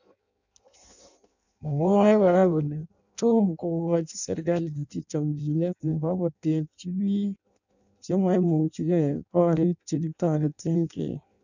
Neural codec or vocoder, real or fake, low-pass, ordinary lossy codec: codec, 16 kHz in and 24 kHz out, 0.6 kbps, FireRedTTS-2 codec; fake; 7.2 kHz; none